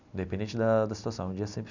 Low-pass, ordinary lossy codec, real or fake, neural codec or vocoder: 7.2 kHz; none; real; none